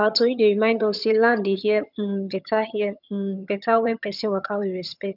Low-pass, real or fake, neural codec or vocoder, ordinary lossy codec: 5.4 kHz; fake; vocoder, 22.05 kHz, 80 mel bands, HiFi-GAN; none